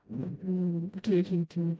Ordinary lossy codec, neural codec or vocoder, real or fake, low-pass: none; codec, 16 kHz, 0.5 kbps, FreqCodec, smaller model; fake; none